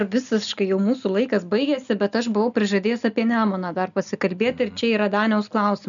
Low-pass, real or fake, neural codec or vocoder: 7.2 kHz; real; none